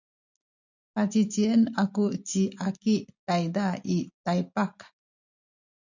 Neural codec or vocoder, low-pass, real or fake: none; 7.2 kHz; real